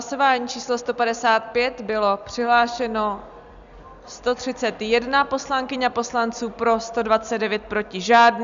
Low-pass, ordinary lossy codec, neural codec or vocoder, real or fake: 7.2 kHz; Opus, 64 kbps; none; real